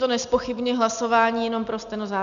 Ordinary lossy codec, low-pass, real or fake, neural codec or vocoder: MP3, 96 kbps; 7.2 kHz; real; none